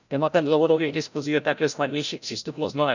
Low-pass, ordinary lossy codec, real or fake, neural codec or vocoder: 7.2 kHz; none; fake; codec, 16 kHz, 0.5 kbps, FreqCodec, larger model